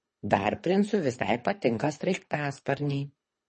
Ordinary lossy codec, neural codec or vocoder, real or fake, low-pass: MP3, 32 kbps; codec, 24 kHz, 3 kbps, HILCodec; fake; 10.8 kHz